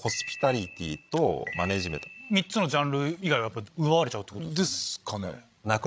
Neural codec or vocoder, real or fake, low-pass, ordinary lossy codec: codec, 16 kHz, 16 kbps, FreqCodec, larger model; fake; none; none